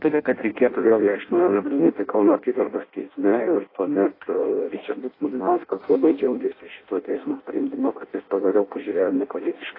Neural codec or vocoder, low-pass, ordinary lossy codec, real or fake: codec, 16 kHz in and 24 kHz out, 0.6 kbps, FireRedTTS-2 codec; 5.4 kHz; AAC, 24 kbps; fake